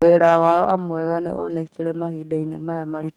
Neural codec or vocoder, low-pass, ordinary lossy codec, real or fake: codec, 44.1 kHz, 2.6 kbps, DAC; 19.8 kHz; none; fake